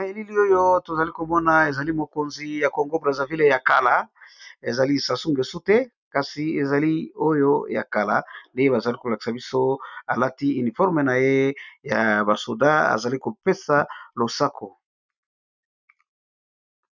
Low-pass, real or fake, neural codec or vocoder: 7.2 kHz; real; none